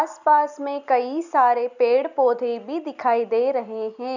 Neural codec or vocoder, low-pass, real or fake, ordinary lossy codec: none; 7.2 kHz; real; none